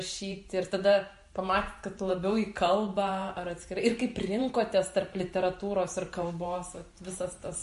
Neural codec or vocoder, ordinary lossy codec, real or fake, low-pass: vocoder, 44.1 kHz, 128 mel bands every 512 samples, BigVGAN v2; MP3, 48 kbps; fake; 14.4 kHz